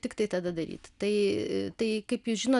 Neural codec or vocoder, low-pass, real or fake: none; 10.8 kHz; real